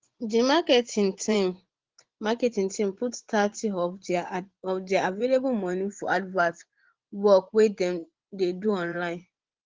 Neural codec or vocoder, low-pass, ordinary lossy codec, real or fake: vocoder, 24 kHz, 100 mel bands, Vocos; 7.2 kHz; Opus, 16 kbps; fake